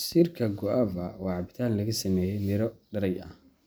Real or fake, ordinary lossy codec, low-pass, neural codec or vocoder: real; none; none; none